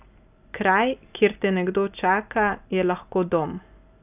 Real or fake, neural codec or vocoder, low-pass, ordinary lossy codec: real; none; 3.6 kHz; none